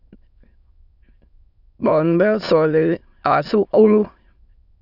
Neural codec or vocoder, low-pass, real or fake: autoencoder, 22.05 kHz, a latent of 192 numbers a frame, VITS, trained on many speakers; 5.4 kHz; fake